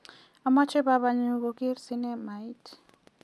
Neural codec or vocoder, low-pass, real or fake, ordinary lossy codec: none; none; real; none